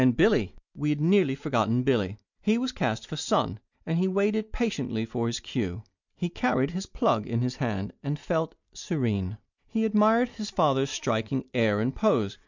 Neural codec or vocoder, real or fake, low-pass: none; real; 7.2 kHz